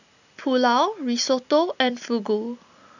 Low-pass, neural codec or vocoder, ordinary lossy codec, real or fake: 7.2 kHz; none; none; real